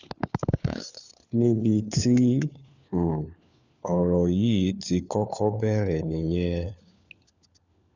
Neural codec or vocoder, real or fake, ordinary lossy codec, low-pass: codec, 16 kHz, 4 kbps, FunCodec, trained on LibriTTS, 50 frames a second; fake; none; 7.2 kHz